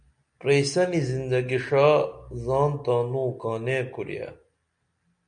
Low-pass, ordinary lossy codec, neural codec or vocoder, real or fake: 9.9 kHz; MP3, 96 kbps; none; real